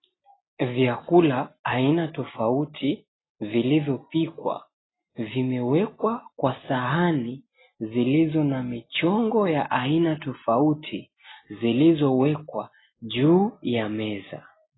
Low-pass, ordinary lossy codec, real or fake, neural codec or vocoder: 7.2 kHz; AAC, 16 kbps; real; none